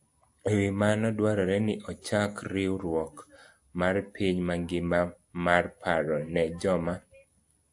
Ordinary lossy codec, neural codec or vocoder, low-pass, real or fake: AAC, 64 kbps; none; 10.8 kHz; real